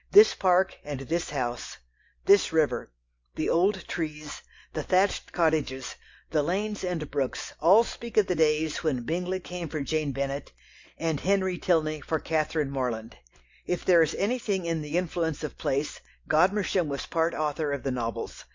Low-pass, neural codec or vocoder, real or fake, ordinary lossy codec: 7.2 kHz; none; real; MP3, 48 kbps